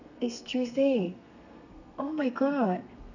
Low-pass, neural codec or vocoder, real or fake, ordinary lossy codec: 7.2 kHz; codec, 32 kHz, 1.9 kbps, SNAC; fake; none